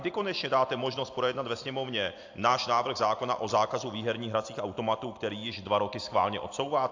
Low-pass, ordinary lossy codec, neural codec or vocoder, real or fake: 7.2 kHz; AAC, 48 kbps; none; real